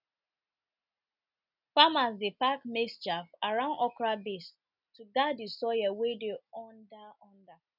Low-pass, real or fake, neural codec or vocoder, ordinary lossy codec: 5.4 kHz; real; none; none